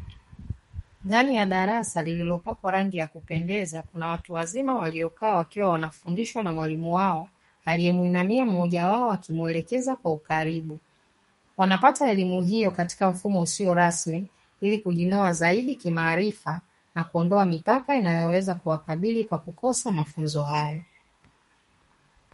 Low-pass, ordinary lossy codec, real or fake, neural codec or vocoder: 14.4 kHz; MP3, 48 kbps; fake; codec, 32 kHz, 1.9 kbps, SNAC